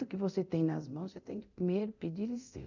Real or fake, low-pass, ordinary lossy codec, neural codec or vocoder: fake; 7.2 kHz; none; codec, 24 kHz, 0.9 kbps, DualCodec